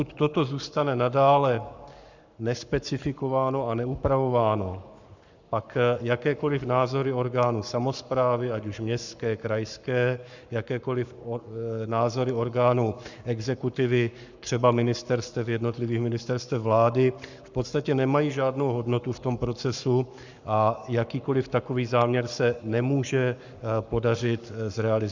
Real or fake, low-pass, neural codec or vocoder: fake; 7.2 kHz; codec, 44.1 kHz, 7.8 kbps, Pupu-Codec